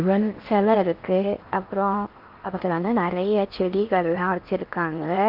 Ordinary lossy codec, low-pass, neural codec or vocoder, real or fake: Opus, 24 kbps; 5.4 kHz; codec, 16 kHz in and 24 kHz out, 0.8 kbps, FocalCodec, streaming, 65536 codes; fake